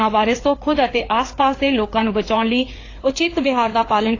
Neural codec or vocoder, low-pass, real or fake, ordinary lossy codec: codec, 16 kHz, 4 kbps, FreqCodec, larger model; 7.2 kHz; fake; AAC, 32 kbps